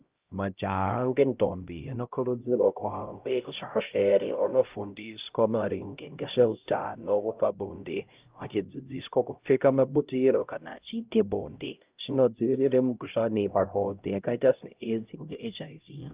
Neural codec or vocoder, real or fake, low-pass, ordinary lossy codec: codec, 16 kHz, 0.5 kbps, X-Codec, HuBERT features, trained on LibriSpeech; fake; 3.6 kHz; Opus, 24 kbps